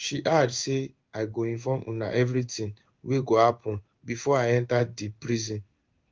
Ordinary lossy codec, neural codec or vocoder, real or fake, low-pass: Opus, 32 kbps; codec, 16 kHz in and 24 kHz out, 1 kbps, XY-Tokenizer; fake; 7.2 kHz